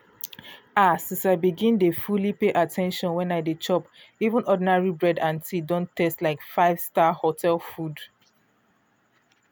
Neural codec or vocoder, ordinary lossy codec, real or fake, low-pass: none; none; real; none